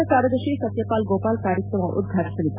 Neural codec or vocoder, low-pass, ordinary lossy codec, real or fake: none; 3.6 kHz; none; real